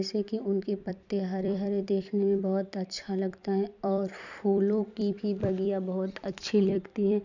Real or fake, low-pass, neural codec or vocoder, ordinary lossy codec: fake; 7.2 kHz; vocoder, 44.1 kHz, 128 mel bands every 256 samples, BigVGAN v2; none